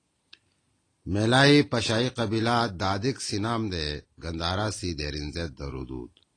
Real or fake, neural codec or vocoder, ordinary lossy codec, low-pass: real; none; AAC, 32 kbps; 9.9 kHz